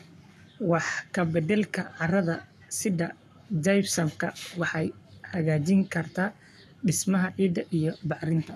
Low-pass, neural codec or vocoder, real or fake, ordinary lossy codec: 14.4 kHz; codec, 44.1 kHz, 7.8 kbps, Pupu-Codec; fake; none